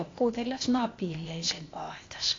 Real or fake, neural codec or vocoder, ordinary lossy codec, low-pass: fake; codec, 16 kHz, 0.8 kbps, ZipCodec; AAC, 32 kbps; 7.2 kHz